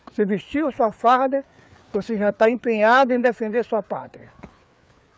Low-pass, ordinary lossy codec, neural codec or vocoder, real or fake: none; none; codec, 16 kHz, 4 kbps, FreqCodec, larger model; fake